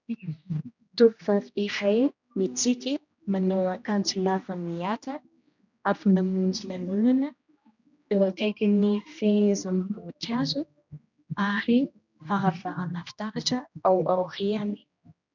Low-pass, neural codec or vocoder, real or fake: 7.2 kHz; codec, 16 kHz, 1 kbps, X-Codec, HuBERT features, trained on general audio; fake